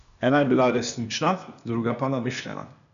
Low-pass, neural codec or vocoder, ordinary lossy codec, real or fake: 7.2 kHz; codec, 16 kHz, 0.8 kbps, ZipCodec; none; fake